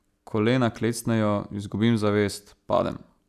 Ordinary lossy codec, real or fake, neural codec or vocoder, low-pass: none; real; none; 14.4 kHz